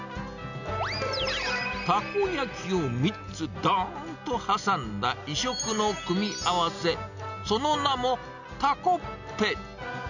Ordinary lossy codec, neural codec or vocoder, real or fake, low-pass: none; none; real; 7.2 kHz